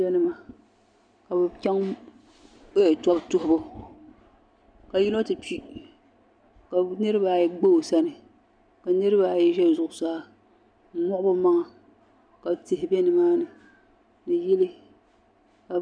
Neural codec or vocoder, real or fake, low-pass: none; real; 9.9 kHz